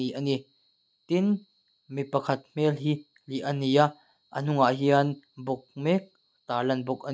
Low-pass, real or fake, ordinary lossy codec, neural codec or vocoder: none; real; none; none